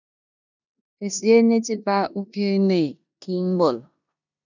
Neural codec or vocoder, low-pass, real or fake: codec, 16 kHz in and 24 kHz out, 0.9 kbps, LongCat-Audio-Codec, four codebook decoder; 7.2 kHz; fake